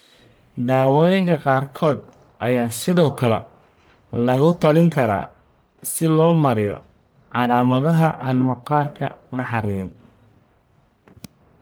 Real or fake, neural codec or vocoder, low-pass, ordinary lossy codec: fake; codec, 44.1 kHz, 1.7 kbps, Pupu-Codec; none; none